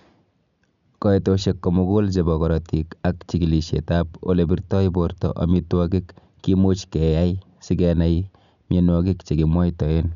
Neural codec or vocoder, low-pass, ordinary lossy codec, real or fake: none; 7.2 kHz; none; real